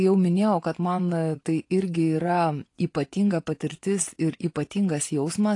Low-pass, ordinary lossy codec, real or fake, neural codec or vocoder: 10.8 kHz; AAC, 48 kbps; fake; vocoder, 24 kHz, 100 mel bands, Vocos